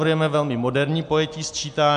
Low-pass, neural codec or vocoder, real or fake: 9.9 kHz; none; real